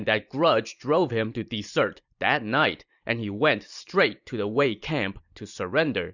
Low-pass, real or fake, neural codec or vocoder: 7.2 kHz; fake; vocoder, 44.1 kHz, 128 mel bands every 512 samples, BigVGAN v2